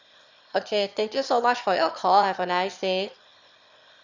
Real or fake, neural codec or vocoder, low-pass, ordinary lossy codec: fake; autoencoder, 22.05 kHz, a latent of 192 numbers a frame, VITS, trained on one speaker; 7.2 kHz; Opus, 64 kbps